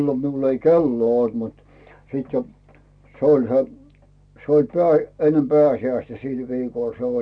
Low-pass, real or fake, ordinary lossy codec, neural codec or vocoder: 9.9 kHz; real; none; none